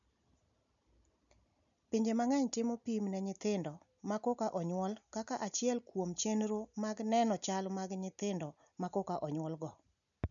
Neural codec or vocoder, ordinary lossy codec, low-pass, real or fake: none; none; 7.2 kHz; real